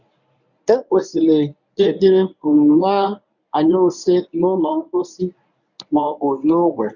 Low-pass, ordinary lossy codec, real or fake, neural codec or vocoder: 7.2 kHz; none; fake; codec, 24 kHz, 0.9 kbps, WavTokenizer, medium speech release version 1